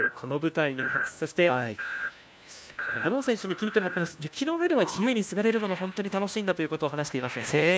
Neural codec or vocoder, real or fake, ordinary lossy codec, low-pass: codec, 16 kHz, 1 kbps, FunCodec, trained on LibriTTS, 50 frames a second; fake; none; none